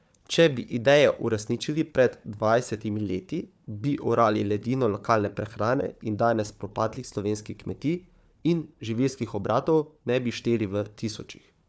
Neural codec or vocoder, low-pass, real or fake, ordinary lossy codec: codec, 16 kHz, 4 kbps, FunCodec, trained on Chinese and English, 50 frames a second; none; fake; none